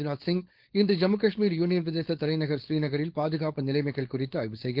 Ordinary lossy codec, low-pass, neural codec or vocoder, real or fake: Opus, 16 kbps; 5.4 kHz; codec, 16 kHz, 4.8 kbps, FACodec; fake